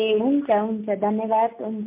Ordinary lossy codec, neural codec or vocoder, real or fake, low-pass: MP3, 24 kbps; none; real; 3.6 kHz